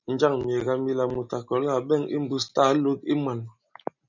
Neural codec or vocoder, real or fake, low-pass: none; real; 7.2 kHz